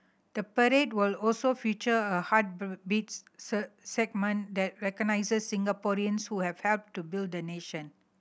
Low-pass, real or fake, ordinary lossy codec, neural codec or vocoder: none; real; none; none